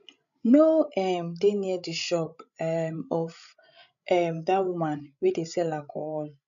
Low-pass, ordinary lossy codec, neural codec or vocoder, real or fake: 7.2 kHz; none; codec, 16 kHz, 16 kbps, FreqCodec, larger model; fake